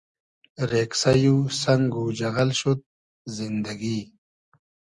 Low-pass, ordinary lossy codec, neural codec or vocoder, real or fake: 10.8 kHz; Opus, 64 kbps; none; real